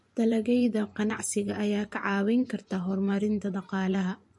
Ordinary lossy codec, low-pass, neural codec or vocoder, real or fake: MP3, 48 kbps; 10.8 kHz; vocoder, 24 kHz, 100 mel bands, Vocos; fake